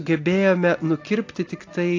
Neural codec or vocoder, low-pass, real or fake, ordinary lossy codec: none; 7.2 kHz; real; AAC, 48 kbps